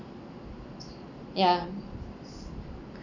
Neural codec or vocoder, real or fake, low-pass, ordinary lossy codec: none; real; 7.2 kHz; none